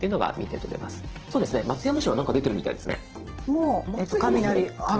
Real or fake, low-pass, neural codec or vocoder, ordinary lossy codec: fake; 7.2 kHz; vocoder, 44.1 kHz, 128 mel bands every 512 samples, BigVGAN v2; Opus, 16 kbps